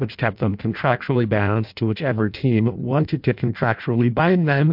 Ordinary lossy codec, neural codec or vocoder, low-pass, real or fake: MP3, 48 kbps; codec, 16 kHz in and 24 kHz out, 0.6 kbps, FireRedTTS-2 codec; 5.4 kHz; fake